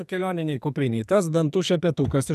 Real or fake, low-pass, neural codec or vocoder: fake; 14.4 kHz; codec, 44.1 kHz, 2.6 kbps, SNAC